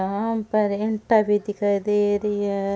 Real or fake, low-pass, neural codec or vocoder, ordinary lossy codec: real; none; none; none